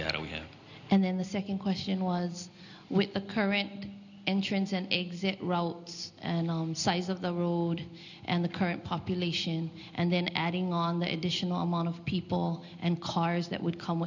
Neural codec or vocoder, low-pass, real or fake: none; 7.2 kHz; real